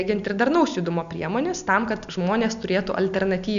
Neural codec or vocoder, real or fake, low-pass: none; real; 7.2 kHz